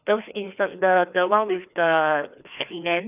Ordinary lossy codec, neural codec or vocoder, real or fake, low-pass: none; codec, 16 kHz, 2 kbps, FreqCodec, larger model; fake; 3.6 kHz